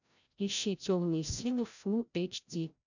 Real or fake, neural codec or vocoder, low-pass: fake; codec, 16 kHz, 0.5 kbps, FreqCodec, larger model; 7.2 kHz